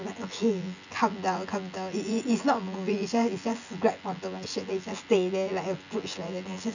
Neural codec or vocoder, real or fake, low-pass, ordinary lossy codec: vocoder, 24 kHz, 100 mel bands, Vocos; fake; 7.2 kHz; none